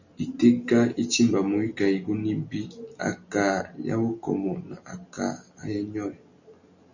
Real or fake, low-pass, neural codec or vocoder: real; 7.2 kHz; none